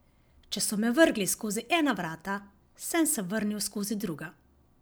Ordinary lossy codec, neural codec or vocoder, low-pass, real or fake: none; none; none; real